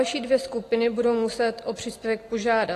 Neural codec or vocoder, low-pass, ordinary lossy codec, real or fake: none; 14.4 kHz; AAC, 48 kbps; real